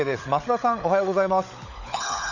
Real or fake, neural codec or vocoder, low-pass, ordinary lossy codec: fake; codec, 16 kHz, 16 kbps, FunCodec, trained on LibriTTS, 50 frames a second; 7.2 kHz; none